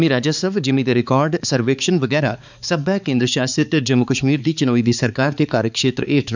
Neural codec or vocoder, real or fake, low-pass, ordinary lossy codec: codec, 16 kHz, 4 kbps, X-Codec, HuBERT features, trained on balanced general audio; fake; 7.2 kHz; none